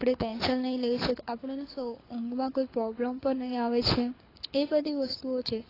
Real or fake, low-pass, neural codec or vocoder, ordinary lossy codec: fake; 5.4 kHz; codec, 24 kHz, 6 kbps, HILCodec; AAC, 24 kbps